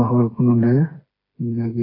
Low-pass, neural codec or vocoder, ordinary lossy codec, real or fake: 5.4 kHz; codec, 16 kHz, 4 kbps, FreqCodec, smaller model; AAC, 24 kbps; fake